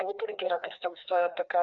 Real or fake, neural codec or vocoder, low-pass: fake; codec, 16 kHz, 2 kbps, FreqCodec, larger model; 7.2 kHz